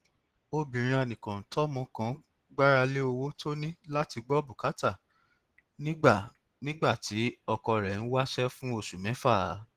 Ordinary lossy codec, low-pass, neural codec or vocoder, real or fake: Opus, 16 kbps; 14.4 kHz; none; real